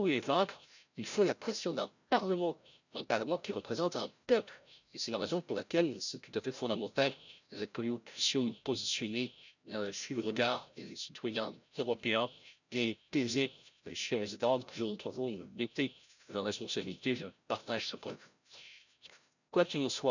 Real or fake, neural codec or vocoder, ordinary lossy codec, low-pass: fake; codec, 16 kHz, 0.5 kbps, FreqCodec, larger model; none; 7.2 kHz